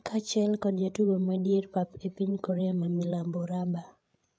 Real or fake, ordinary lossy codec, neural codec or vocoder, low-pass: fake; none; codec, 16 kHz, 8 kbps, FreqCodec, larger model; none